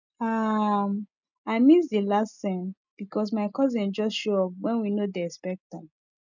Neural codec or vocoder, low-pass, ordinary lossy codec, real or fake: none; 7.2 kHz; none; real